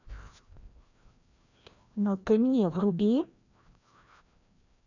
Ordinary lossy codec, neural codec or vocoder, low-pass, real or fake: none; codec, 16 kHz, 1 kbps, FreqCodec, larger model; 7.2 kHz; fake